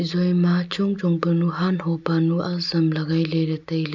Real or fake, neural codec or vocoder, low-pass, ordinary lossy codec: real; none; 7.2 kHz; none